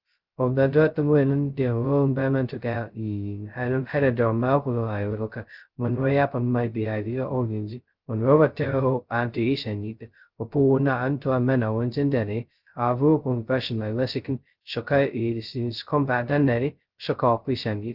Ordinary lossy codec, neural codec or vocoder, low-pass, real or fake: Opus, 32 kbps; codec, 16 kHz, 0.2 kbps, FocalCodec; 5.4 kHz; fake